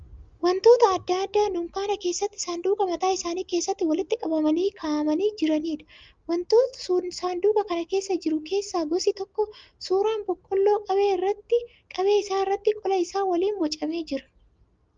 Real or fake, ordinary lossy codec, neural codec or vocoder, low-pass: real; Opus, 32 kbps; none; 7.2 kHz